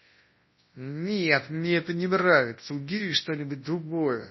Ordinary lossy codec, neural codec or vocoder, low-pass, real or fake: MP3, 24 kbps; codec, 24 kHz, 0.9 kbps, WavTokenizer, large speech release; 7.2 kHz; fake